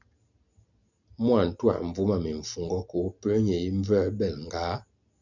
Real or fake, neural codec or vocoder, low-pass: real; none; 7.2 kHz